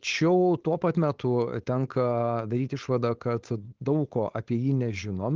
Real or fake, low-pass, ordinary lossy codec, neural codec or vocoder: fake; 7.2 kHz; Opus, 16 kbps; codec, 16 kHz, 4.8 kbps, FACodec